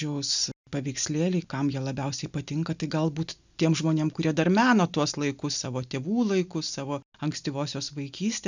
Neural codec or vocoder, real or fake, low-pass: none; real; 7.2 kHz